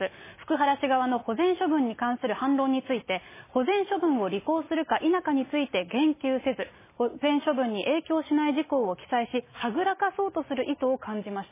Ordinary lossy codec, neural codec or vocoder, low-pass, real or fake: MP3, 16 kbps; none; 3.6 kHz; real